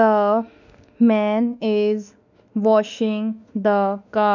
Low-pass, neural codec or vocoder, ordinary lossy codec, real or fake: 7.2 kHz; autoencoder, 48 kHz, 32 numbers a frame, DAC-VAE, trained on Japanese speech; none; fake